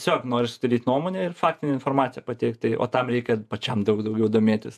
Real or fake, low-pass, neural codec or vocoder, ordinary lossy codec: fake; 14.4 kHz; vocoder, 48 kHz, 128 mel bands, Vocos; AAC, 96 kbps